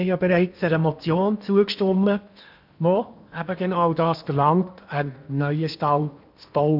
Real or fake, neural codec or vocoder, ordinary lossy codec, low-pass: fake; codec, 16 kHz in and 24 kHz out, 0.8 kbps, FocalCodec, streaming, 65536 codes; none; 5.4 kHz